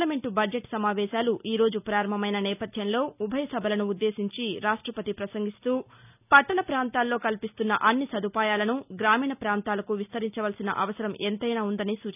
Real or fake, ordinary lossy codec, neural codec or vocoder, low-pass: real; none; none; 3.6 kHz